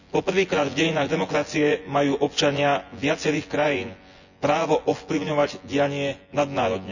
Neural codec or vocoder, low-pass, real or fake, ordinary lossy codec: vocoder, 24 kHz, 100 mel bands, Vocos; 7.2 kHz; fake; none